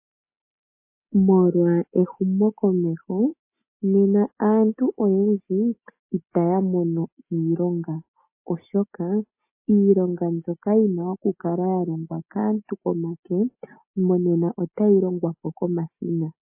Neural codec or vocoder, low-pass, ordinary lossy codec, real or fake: none; 3.6 kHz; MP3, 24 kbps; real